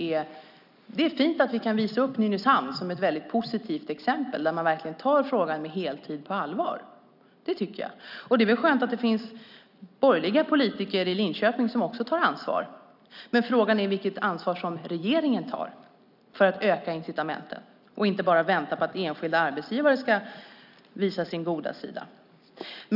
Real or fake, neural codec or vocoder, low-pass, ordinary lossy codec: real; none; 5.4 kHz; none